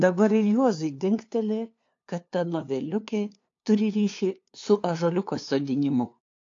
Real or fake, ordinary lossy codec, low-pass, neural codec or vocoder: fake; AAC, 48 kbps; 7.2 kHz; codec, 16 kHz, 2 kbps, FunCodec, trained on LibriTTS, 25 frames a second